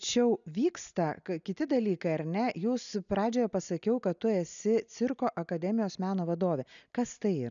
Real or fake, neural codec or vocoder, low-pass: real; none; 7.2 kHz